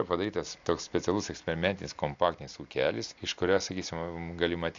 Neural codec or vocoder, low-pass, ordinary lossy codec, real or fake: none; 7.2 kHz; AAC, 64 kbps; real